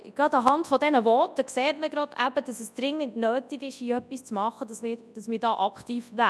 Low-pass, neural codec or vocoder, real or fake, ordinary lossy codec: none; codec, 24 kHz, 0.9 kbps, WavTokenizer, large speech release; fake; none